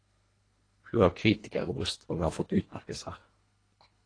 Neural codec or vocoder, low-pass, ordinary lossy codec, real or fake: codec, 24 kHz, 1.5 kbps, HILCodec; 9.9 kHz; AAC, 32 kbps; fake